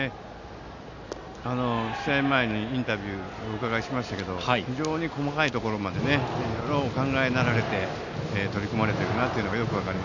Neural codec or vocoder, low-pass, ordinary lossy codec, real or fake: none; 7.2 kHz; none; real